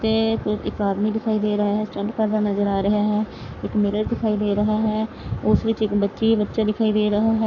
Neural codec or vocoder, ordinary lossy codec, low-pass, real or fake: codec, 44.1 kHz, 7.8 kbps, Pupu-Codec; none; 7.2 kHz; fake